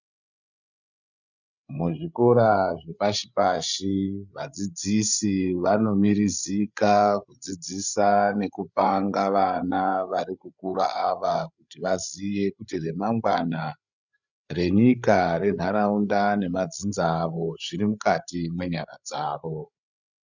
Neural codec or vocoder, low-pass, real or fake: codec, 16 kHz, 8 kbps, FreqCodec, larger model; 7.2 kHz; fake